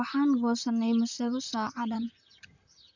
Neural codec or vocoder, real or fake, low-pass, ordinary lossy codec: vocoder, 44.1 kHz, 128 mel bands, Pupu-Vocoder; fake; 7.2 kHz; none